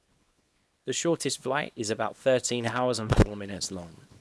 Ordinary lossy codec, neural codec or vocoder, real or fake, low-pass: none; codec, 24 kHz, 0.9 kbps, WavTokenizer, small release; fake; none